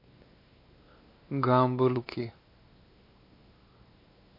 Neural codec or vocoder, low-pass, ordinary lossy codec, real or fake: codec, 16 kHz, 2 kbps, X-Codec, WavLM features, trained on Multilingual LibriSpeech; 5.4 kHz; MP3, 32 kbps; fake